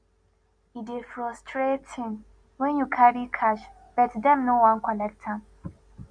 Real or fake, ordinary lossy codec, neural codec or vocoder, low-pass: real; MP3, 96 kbps; none; 9.9 kHz